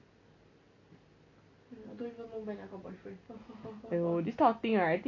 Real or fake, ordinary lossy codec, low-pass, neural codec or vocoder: real; none; 7.2 kHz; none